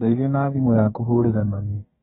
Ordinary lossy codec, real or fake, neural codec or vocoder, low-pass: AAC, 16 kbps; fake; codec, 32 kHz, 1.9 kbps, SNAC; 14.4 kHz